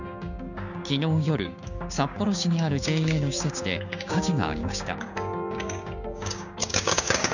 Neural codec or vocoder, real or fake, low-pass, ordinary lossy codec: codec, 16 kHz, 6 kbps, DAC; fake; 7.2 kHz; none